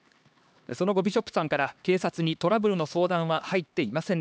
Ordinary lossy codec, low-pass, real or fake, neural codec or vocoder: none; none; fake; codec, 16 kHz, 2 kbps, X-Codec, HuBERT features, trained on LibriSpeech